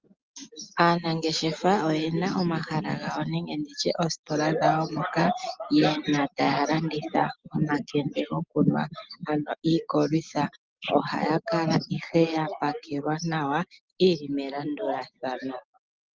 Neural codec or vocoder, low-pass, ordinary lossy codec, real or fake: none; 7.2 kHz; Opus, 32 kbps; real